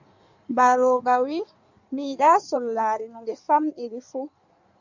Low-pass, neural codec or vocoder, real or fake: 7.2 kHz; codec, 16 kHz in and 24 kHz out, 1.1 kbps, FireRedTTS-2 codec; fake